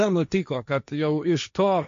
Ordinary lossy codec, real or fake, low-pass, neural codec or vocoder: MP3, 48 kbps; fake; 7.2 kHz; codec, 16 kHz, 1.1 kbps, Voila-Tokenizer